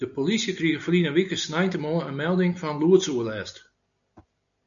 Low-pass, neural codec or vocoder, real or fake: 7.2 kHz; none; real